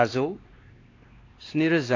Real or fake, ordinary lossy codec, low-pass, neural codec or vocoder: fake; AAC, 32 kbps; 7.2 kHz; codec, 16 kHz, 2 kbps, X-Codec, WavLM features, trained on Multilingual LibriSpeech